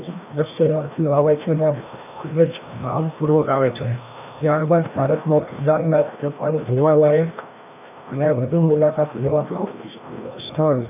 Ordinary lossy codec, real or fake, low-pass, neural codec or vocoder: none; fake; 3.6 kHz; codec, 16 kHz, 1 kbps, FreqCodec, larger model